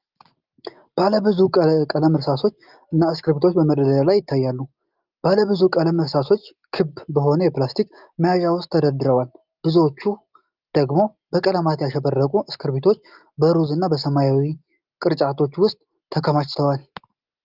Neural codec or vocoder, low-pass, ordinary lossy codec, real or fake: none; 5.4 kHz; Opus, 32 kbps; real